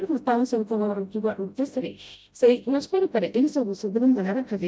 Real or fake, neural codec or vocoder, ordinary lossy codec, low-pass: fake; codec, 16 kHz, 0.5 kbps, FreqCodec, smaller model; none; none